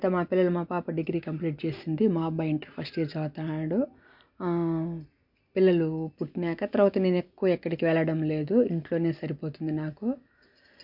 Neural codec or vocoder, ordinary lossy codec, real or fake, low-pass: none; MP3, 48 kbps; real; 5.4 kHz